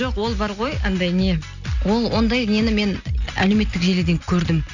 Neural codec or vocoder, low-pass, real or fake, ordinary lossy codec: none; 7.2 kHz; real; none